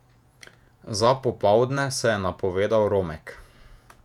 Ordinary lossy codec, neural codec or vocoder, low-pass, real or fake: none; vocoder, 48 kHz, 128 mel bands, Vocos; 19.8 kHz; fake